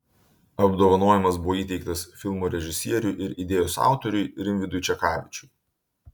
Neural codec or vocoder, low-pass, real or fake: none; 19.8 kHz; real